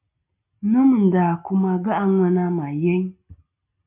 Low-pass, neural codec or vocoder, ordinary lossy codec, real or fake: 3.6 kHz; none; MP3, 32 kbps; real